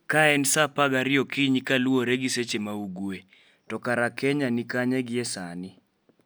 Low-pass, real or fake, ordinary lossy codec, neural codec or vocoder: none; real; none; none